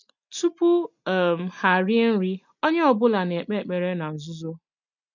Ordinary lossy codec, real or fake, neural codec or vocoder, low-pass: none; real; none; 7.2 kHz